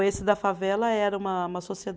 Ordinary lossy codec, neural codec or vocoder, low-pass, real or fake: none; none; none; real